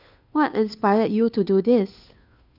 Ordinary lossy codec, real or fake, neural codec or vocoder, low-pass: none; fake; codec, 16 kHz, 2 kbps, FunCodec, trained on Chinese and English, 25 frames a second; 5.4 kHz